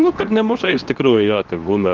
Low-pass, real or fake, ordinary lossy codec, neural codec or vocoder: 7.2 kHz; fake; Opus, 32 kbps; codec, 24 kHz, 0.9 kbps, WavTokenizer, medium speech release version 2